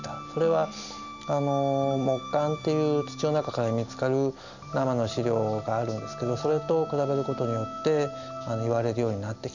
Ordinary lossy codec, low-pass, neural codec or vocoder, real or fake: none; 7.2 kHz; none; real